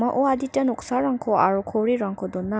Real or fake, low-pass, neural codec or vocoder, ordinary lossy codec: real; none; none; none